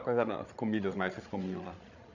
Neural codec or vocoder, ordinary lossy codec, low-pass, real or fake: codec, 16 kHz, 16 kbps, FreqCodec, larger model; none; 7.2 kHz; fake